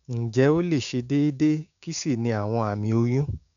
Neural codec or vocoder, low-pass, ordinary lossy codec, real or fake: codec, 16 kHz, 6 kbps, DAC; 7.2 kHz; none; fake